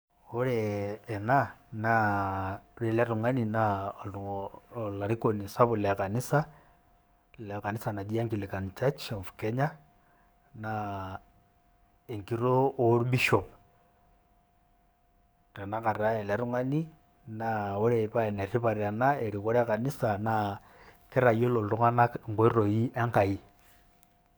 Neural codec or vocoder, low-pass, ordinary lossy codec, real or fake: codec, 44.1 kHz, 7.8 kbps, DAC; none; none; fake